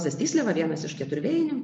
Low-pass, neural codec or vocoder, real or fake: 9.9 kHz; none; real